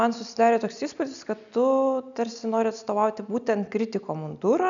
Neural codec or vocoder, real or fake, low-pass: none; real; 7.2 kHz